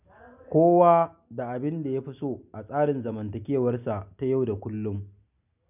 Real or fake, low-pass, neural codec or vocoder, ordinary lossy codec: real; 3.6 kHz; none; none